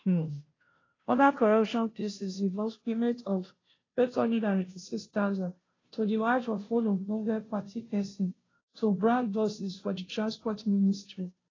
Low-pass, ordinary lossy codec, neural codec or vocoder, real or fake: 7.2 kHz; AAC, 32 kbps; codec, 16 kHz, 0.5 kbps, FunCodec, trained on Chinese and English, 25 frames a second; fake